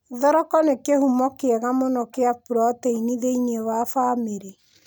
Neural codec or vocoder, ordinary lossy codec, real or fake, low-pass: none; none; real; none